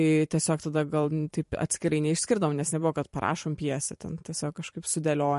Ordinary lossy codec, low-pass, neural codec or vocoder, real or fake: MP3, 48 kbps; 14.4 kHz; vocoder, 44.1 kHz, 128 mel bands every 512 samples, BigVGAN v2; fake